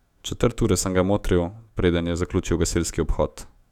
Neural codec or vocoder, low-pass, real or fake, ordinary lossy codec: autoencoder, 48 kHz, 128 numbers a frame, DAC-VAE, trained on Japanese speech; 19.8 kHz; fake; none